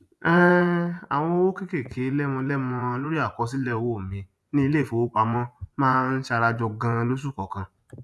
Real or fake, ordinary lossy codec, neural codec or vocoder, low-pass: fake; none; vocoder, 24 kHz, 100 mel bands, Vocos; none